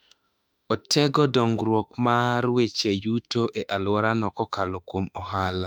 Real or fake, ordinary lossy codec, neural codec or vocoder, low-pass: fake; none; autoencoder, 48 kHz, 32 numbers a frame, DAC-VAE, trained on Japanese speech; 19.8 kHz